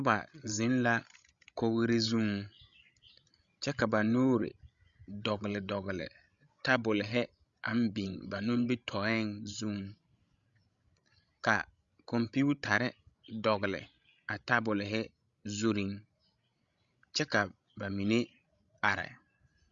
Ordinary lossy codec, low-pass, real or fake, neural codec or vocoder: Opus, 64 kbps; 7.2 kHz; fake; codec, 16 kHz, 16 kbps, FreqCodec, larger model